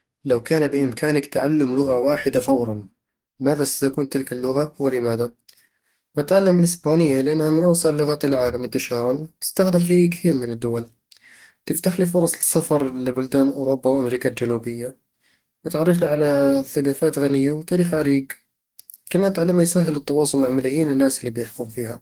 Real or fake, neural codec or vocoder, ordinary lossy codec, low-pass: fake; codec, 44.1 kHz, 2.6 kbps, DAC; Opus, 24 kbps; 19.8 kHz